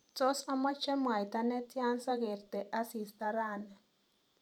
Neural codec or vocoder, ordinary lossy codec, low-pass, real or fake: vocoder, 44.1 kHz, 128 mel bands every 512 samples, BigVGAN v2; none; 19.8 kHz; fake